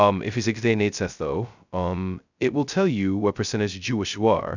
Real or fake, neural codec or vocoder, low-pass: fake; codec, 16 kHz, 0.2 kbps, FocalCodec; 7.2 kHz